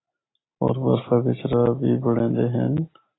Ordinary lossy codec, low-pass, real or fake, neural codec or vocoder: AAC, 16 kbps; 7.2 kHz; real; none